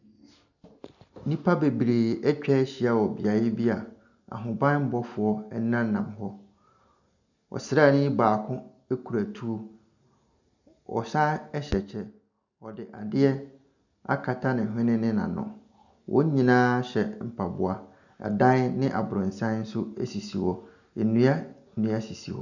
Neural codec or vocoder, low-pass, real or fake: none; 7.2 kHz; real